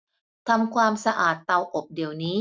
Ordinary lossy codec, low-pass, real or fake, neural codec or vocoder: none; none; real; none